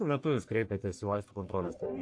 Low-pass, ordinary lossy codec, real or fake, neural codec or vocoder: 9.9 kHz; AAC, 48 kbps; fake; codec, 44.1 kHz, 1.7 kbps, Pupu-Codec